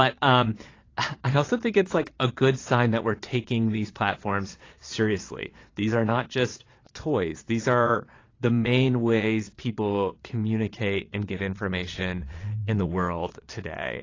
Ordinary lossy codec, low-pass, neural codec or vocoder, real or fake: AAC, 32 kbps; 7.2 kHz; vocoder, 22.05 kHz, 80 mel bands, Vocos; fake